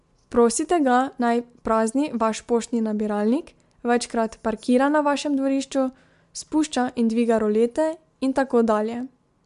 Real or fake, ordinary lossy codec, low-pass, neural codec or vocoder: real; MP3, 64 kbps; 10.8 kHz; none